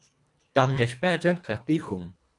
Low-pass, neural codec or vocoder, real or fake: 10.8 kHz; codec, 24 kHz, 1.5 kbps, HILCodec; fake